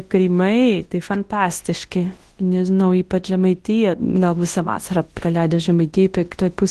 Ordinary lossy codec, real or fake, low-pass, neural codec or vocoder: Opus, 16 kbps; fake; 10.8 kHz; codec, 24 kHz, 0.9 kbps, WavTokenizer, large speech release